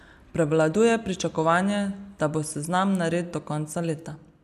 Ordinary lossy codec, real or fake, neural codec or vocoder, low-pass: none; real; none; 14.4 kHz